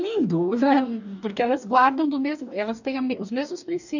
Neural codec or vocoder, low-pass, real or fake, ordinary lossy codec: codec, 44.1 kHz, 2.6 kbps, DAC; 7.2 kHz; fake; none